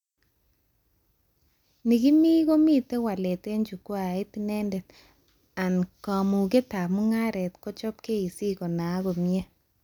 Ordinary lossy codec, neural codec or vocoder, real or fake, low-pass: none; none; real; 19.8 kHz